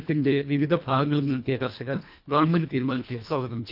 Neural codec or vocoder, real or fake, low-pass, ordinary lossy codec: codec, 24 kHz, 1.5 kbps, HILCodec; fake; 5.4 kHz; none